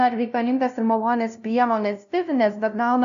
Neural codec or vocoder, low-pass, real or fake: codec, 16 kHz, 0.5 kbps, FunCodec, trained on LibriTTS, 25 frames a second; 7.2 kHz; fake